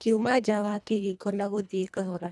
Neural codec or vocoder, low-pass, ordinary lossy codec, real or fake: codec, 24 kHz, 1.5 kbps, HILCodec; none; none; fake